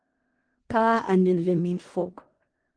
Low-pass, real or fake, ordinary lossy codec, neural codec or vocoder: 9.9 kHz; fake; Opus, 16 kbps; codec, 16 kHz in and 24 kHz out, 0.4 kbps, LongCat-Audio-Codec, four codebook decoder